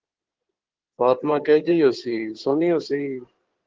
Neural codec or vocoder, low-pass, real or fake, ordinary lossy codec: codec, 16 kHz in and 24 kHz out, 2.2 kbps, FireRedTTS-2 codec; 7.2 kHz; fake; Opus, 16 kbps